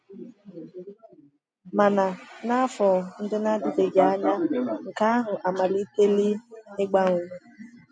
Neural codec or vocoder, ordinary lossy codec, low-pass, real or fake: none; MP3, 64 kbps; 9.9 kHz; real